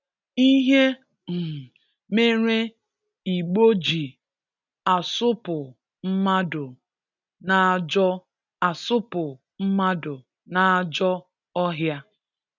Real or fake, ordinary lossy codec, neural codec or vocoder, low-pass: real; none; none; 7.2 kHz